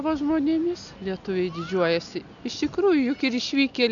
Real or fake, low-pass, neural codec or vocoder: real; 7.2 kHz; none